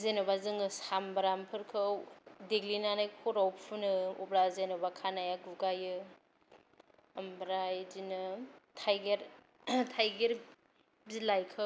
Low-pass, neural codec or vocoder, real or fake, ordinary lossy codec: none; none; real; none